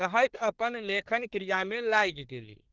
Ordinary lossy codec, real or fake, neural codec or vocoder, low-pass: Opus, 16 kbps; fake; codec, 24 kHz, 1 kbps, SNAC; 7.2 kHz